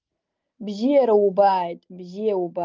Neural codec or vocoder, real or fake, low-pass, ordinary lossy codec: none; real; 7.2 kHz; Opus, 32 kbps